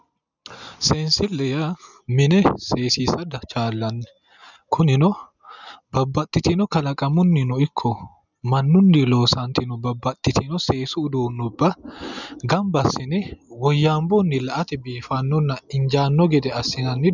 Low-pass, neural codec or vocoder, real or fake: 7.2 kHz; none; real